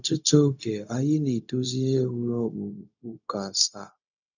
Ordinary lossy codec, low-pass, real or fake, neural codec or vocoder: none; 7.2 kHz; fake; codec, 16 kHz, 0.4 kbps, LongCat-Audio-Codec